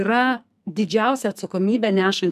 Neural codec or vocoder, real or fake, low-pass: codec, 44.1 kHz, 2.6 kbps, SNAC; fake; 14.4 kHz